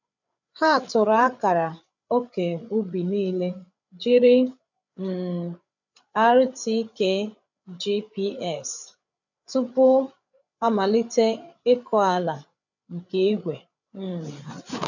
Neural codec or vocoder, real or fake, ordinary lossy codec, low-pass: codec, 16 kHz, 8 kbps, FreqCodec, larger model; fake; none; 7.2 kHz